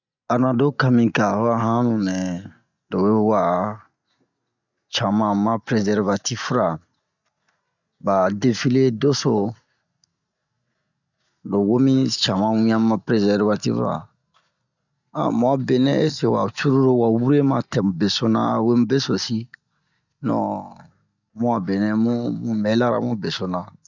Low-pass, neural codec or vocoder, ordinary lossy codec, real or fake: 7.2 kHz; none; none; real